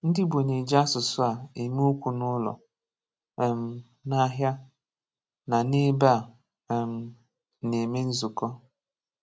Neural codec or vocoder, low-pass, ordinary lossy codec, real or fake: none; none; none; real